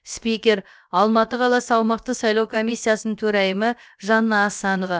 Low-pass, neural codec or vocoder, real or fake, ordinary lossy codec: none; codec, 16 kHz, about 1 kbps, DyCAST, with the encoder's durations; fake; none